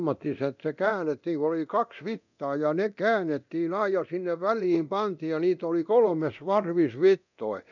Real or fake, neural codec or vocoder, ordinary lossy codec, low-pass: fake; codec, 24 kHz, 0.9 kbps, DualCodec; none; 7.2 kHz